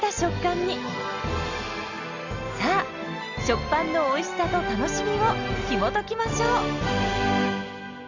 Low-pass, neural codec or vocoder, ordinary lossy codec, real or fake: 7.2 kHz; none; Opus, 64 kbps; real